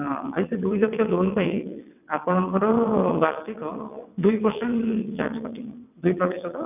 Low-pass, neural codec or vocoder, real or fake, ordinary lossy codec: 3.6 kHz; vocoder, 22.05 kHz, 80 mel bands, WaveNeXt; fake; none